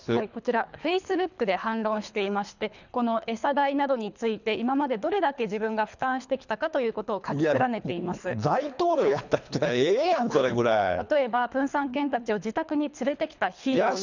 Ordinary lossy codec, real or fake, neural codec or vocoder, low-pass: none; fake; codec, 24 kHz, 3 kbps, HILCodec; 7.2 kHz